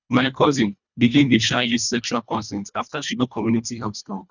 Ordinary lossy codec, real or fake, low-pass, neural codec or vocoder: none; fake; 7.2 kHz; codec, 24 kHz, 1.5 kbps, HILCodec